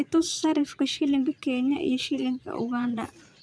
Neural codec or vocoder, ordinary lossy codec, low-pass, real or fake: vocoder, 22.05 kHz, 80 mel bands, Vocos; none; none; fake